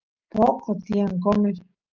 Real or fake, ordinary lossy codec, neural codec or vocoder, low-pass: real; Opus, 24 kbps; none; 7.2 kHz